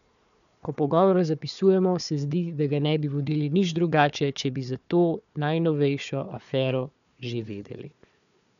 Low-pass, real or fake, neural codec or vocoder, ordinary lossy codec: 7.2 kHz; fake; codec, 16 kHz, 4 kbps, FunCodec, trained on Chinese and English, 50 frames a second; none